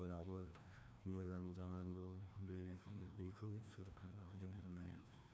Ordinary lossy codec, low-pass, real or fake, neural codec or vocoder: none; none; fake; codec, 16 kHz, 1 kbps, FreqCodec, larger model